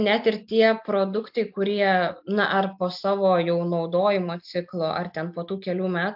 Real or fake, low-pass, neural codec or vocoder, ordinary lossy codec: real; 5.4 kHz; none; AAC, 48 kbps